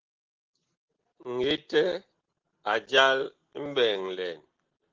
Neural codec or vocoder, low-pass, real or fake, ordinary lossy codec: none; 7.2 kHz; real; Opus, 16 kbps